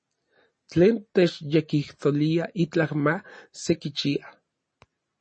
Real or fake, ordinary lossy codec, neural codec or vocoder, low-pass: real; MP3, 32 kbps; none; 9.9 kHz